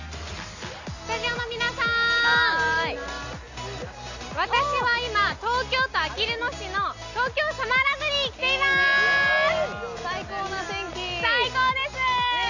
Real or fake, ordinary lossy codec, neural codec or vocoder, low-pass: real; none; none; 7.2 kHz